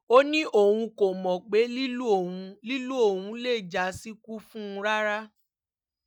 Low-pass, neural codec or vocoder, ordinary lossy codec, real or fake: 19.8 kHz; none; none; real